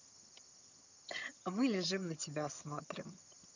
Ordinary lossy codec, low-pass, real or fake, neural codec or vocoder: none; 7.2 kHz; fake; vocoder, 22.05 kHz, 80 mel bands, HiFi-GAN